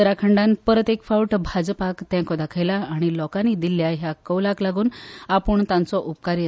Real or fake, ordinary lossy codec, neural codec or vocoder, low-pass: real; none; none; none